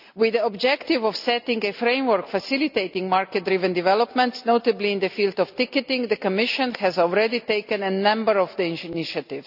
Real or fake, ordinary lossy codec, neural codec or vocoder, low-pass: real; none; none; 5.4 kHz